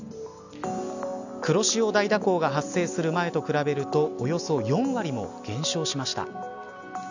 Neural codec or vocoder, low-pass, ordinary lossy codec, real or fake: none; 7.2 kHz; none; real